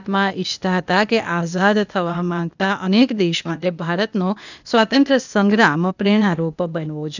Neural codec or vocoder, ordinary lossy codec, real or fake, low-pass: codec, 16 kHz, 0.8 kbps, ZipCodec; none; fake; 7.2 kHz